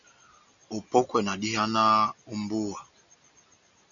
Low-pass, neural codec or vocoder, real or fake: 7.2 kHz; none; real